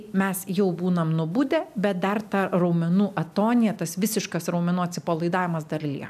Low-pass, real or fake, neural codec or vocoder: 14.4 kHz; real; none